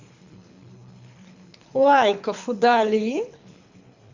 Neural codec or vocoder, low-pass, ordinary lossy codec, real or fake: codec, 24 kHz, 3 kbps, HILCodec; 7.2 kHz; Opus, 64 kbps; fake